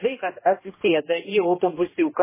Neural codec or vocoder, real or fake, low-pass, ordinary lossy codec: codec, 16 kHz, 1 kbps, X-Codec, HuBERT features, trained on general audio; fake; 3.6 kHz; MP3, 16 kbps